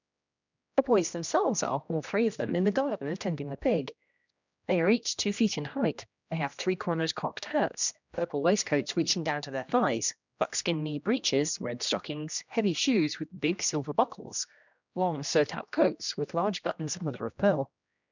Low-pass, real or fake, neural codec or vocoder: 7.2 kHz; fake; codec, 16 kHz, 1 kbps, X-Codec, HuBERT features, trained on general audio